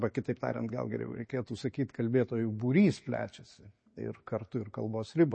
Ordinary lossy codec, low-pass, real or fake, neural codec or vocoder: MP3, 32 kbps; 9.9 kHz; real; none